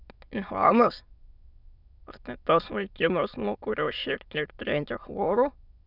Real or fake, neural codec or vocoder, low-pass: fake; autoencoder, 22.05 kHz, a latent of 192 numbers a frame, VITS, trained on many speakers; 5.4 kHz